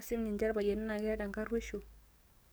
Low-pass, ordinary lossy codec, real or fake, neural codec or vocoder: none; none; fake; codec, 44.1 kHz, 7.8 kbps, DAC